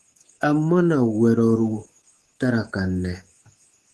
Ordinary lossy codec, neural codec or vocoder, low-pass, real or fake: Opus, 16 kbps; codec, 24 kHz, 3.1 kbps, DualCodec; 10.8 kHz; fake